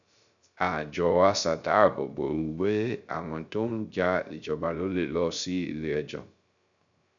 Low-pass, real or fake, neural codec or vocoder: 7.2 kHz; fake; codec, 16 kHz, 0.3 kbps, FocalCodec